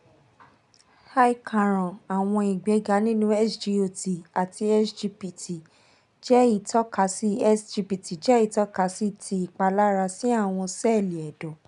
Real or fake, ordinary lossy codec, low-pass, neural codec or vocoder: real; none; 10.8 kHz; none